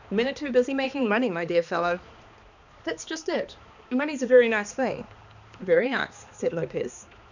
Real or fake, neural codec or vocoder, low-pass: fake; codec, 16 kHz, 2 kbps, X-Codec, HuBERT features, trained on balanced general audio; 7.2 kHz